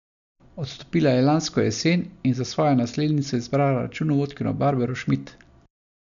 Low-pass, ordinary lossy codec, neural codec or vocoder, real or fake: 7.2 kHz; none; none; real